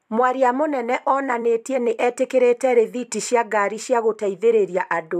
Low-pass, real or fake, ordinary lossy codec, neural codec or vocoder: 14.4 kHz; fake; AAC, 96 kbps; vocoder, 44.1 kHz, 128 mel bands every 256 samples, BigVGAN v2